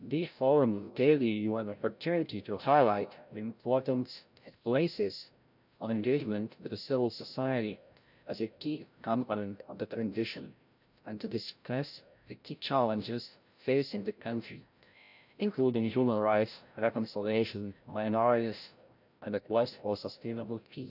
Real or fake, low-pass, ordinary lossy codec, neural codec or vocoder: fake; 5.4 kHz; none; codec, 16 kHz, 0.5 kbps, FreqCodec, larger model